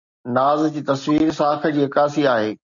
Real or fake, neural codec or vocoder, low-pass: real; none; 7.2 kHz